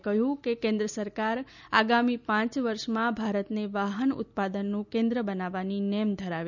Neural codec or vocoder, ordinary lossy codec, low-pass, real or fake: none; none; 7.2 kHz; real